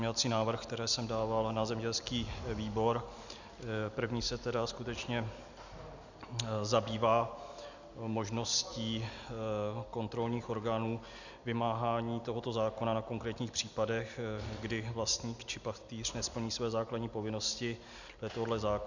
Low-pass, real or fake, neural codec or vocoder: 7.2 kHz; real; none